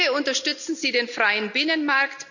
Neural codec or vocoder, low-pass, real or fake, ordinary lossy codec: none; 7.2 kHz; real; none